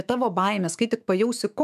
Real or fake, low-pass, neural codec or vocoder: fake; 14.4 kHz; autoencoder, 48 kHz, 128 numbers a frame, DAC-VAE, trained on Japanese speech